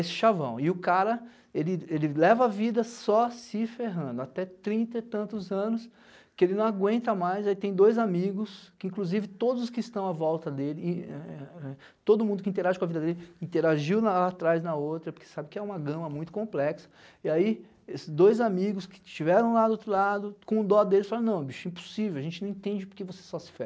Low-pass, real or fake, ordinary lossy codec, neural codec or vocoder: none; real; none; none